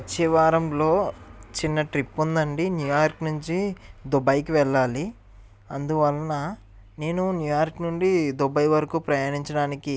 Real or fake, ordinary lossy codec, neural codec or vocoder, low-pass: real; none; none; none